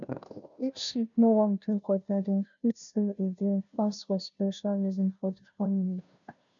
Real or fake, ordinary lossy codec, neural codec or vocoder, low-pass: fake; none; codec, 16 kHz, 0.5 kbps, FunCodec, trained on Chinese and English, 25 frames a second; 7.2 kHz